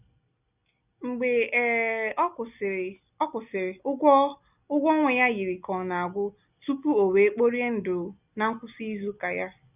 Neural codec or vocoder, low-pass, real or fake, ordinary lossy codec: none; 3.6 kHz; real; none